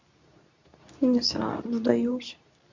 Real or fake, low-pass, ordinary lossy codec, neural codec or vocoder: fake; 7.2 kHz; Opus, 64 kbps; codec, 24 kHz, 0.9 kbps, WavTokenizer, medium speech release version 2